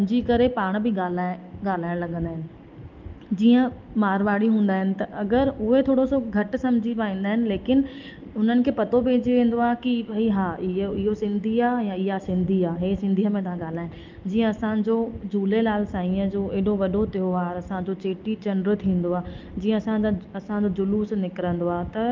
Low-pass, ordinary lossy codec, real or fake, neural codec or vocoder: 7.2 kHz; Opus, 32 kbps; real; none